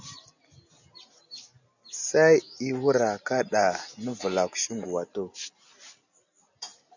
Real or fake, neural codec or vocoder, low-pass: real; none; 7.2 kHz